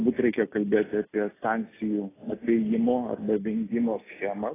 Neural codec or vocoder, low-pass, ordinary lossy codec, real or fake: none; 3.6 kHz; AAC, 16 kbps; real